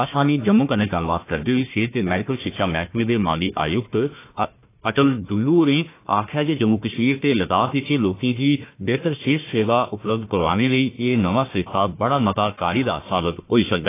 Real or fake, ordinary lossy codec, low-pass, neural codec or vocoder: fake; AAC, 24 kbps; 3.6 kHz; codec, 16 kHz, 1 kbps, FunCodec, trained on Chinese and English, 50 frames a second